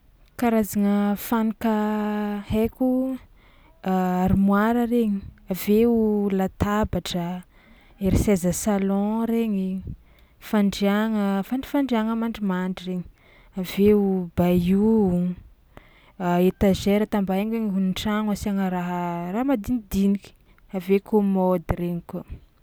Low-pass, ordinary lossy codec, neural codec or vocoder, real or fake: none; none; none; real